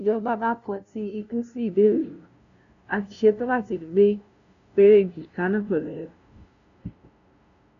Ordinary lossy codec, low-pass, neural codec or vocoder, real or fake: none; 7.2 kHz; codec, 16 kHz, 0.5 kbps, FunCodec, trained on LibriTTS, 25 frames a second; fake